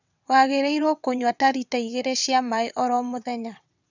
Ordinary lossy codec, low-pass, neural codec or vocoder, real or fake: none; 7.2 kHz; vocoder, 22.05 kHz, 80 mel bands, WaveNeXt; fake